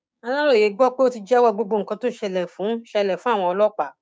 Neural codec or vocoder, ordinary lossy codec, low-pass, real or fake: codec, 16 kHz, 6 kbps, DAC; none; none; fake